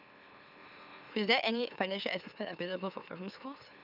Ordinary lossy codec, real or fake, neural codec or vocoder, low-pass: none; fake; autoencoder, 44.1 kHz, a latent of 192 numbers a frame, MeloTTS; 5.4 kHz